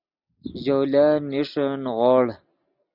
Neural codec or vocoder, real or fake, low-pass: none; real; 5.4 kHz